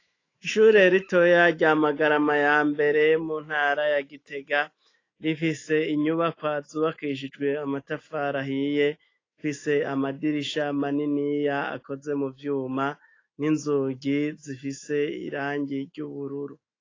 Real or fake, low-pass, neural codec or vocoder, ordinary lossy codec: fake; 7.2 kHz; autoencoder, 48 kHz, 128 numbers a frame, DAC-VAE, trained on Japanese speech; AAC, 32 kbps